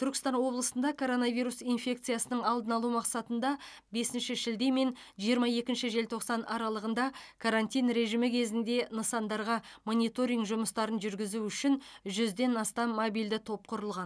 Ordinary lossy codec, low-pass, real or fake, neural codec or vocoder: none; none; real; none